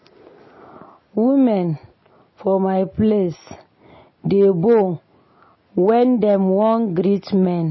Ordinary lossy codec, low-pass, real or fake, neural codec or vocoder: MP3, 24 kbps; 7.2 kHz; real; none